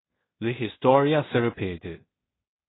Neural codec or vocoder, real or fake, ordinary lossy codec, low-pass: codec, 16 kHz in and 24 kHz out, 0.4 kbps, LongCat-Audio-Codec, two codebook decoder; fake; AAC, 16 kbps; 7.2 kHz